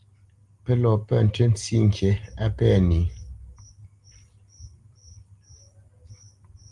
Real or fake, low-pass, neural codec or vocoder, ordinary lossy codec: real; 10.8 kHz; none; Opus, 24 kbps